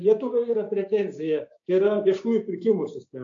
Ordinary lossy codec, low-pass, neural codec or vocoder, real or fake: AAC, 48 kbps; 7.2 kHz; codec, 16 kHz, 6 kbps, DAC; fake